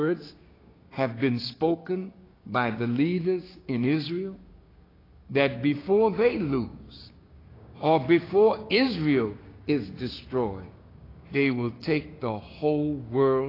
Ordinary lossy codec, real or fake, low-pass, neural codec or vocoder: AAC, 24 kbps; fake; 5.4 kHz; codec, 16 kHz, 6 kbps, DAC